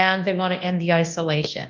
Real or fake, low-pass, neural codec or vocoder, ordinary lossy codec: fake; 7.2 kHz; codec, 24 kHz, 0.9 kbps, WavTokenizer, large speech release; Opus, 32 kbps